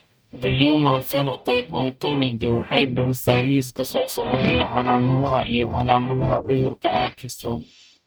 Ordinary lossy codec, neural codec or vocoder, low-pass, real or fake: none; codec, 44.1 kHz, 0.9 kbps, DAC; none; fake